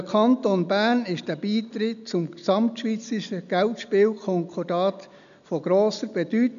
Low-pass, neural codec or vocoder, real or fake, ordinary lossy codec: 7.2 kHz; none; real; none